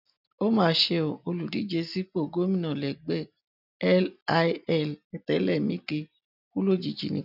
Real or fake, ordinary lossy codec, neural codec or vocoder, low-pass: real; none; none; 5.4 kHz